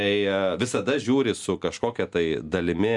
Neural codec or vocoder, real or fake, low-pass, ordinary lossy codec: none; real; 10.8 kHz; MP3, 96 kbps